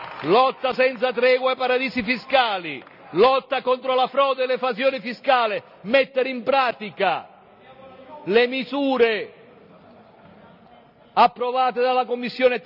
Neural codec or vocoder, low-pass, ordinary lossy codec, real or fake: none; 5.4 kHz; none; real